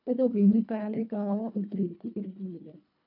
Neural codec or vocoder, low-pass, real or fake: codec, 24 kHz, 1.5 kbps, HILCodec; 5.4 kHz; fake